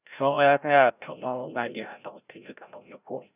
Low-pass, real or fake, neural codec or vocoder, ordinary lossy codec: 3.6 kHz; fake; codec, 16 kHz, 0.5 kbps, FreqCodec, larger model; none